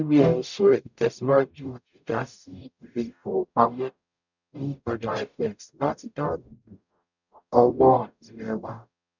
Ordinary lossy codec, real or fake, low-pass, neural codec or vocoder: none; fake; 7.2 kHz; codec, 44.1 kHz, 0.9 kbps, DAC